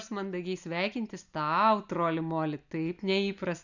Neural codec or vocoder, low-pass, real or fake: none; 7.2 kHz; real